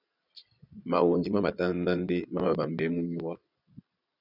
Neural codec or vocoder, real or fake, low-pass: vocoder, 22.05 kHz, 80 mel bands, Vocos; fake; 5.4 kHz